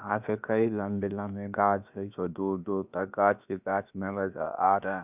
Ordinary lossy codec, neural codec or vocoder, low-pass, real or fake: none; codec, 16 kHz, about 1 kbps, DyCAST, with the encoder's durations; 3.6 kHz; fake